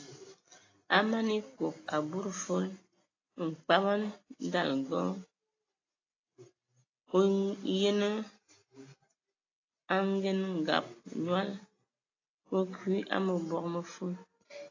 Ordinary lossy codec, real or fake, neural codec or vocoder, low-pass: AAC, 32 kbps; real; none; 7.2 kHz